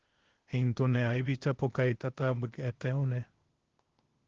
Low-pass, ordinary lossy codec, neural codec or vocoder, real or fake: 7.2 kHz; Opus, 16 kbps; codec, 16 kHz, 0.8 kbps, ZipCodec; fake